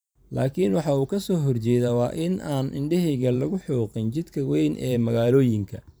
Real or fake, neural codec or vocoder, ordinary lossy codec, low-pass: fake; vocoder, 44.1 kHz, 128 mel bands every 512 samples, BigVGAN v2; none; none